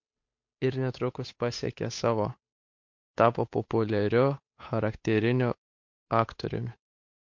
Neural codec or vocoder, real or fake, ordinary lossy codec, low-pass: codec, 16 kHz, 8 kbps, FunCodec, trained on Chinese and English, 25 frames a second; fake; MP3, 48 kbps; 7.2 kHz